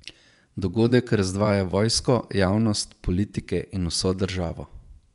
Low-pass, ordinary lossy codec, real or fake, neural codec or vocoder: 10.8 kHz; none; fake; vocoder, 24 kHz, 100 mel bands, Vocos